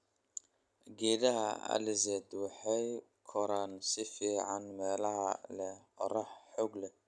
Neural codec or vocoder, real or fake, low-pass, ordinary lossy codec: none; real; none; none